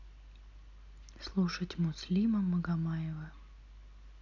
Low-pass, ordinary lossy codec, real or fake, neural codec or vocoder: 7.2 kHz; none; real; none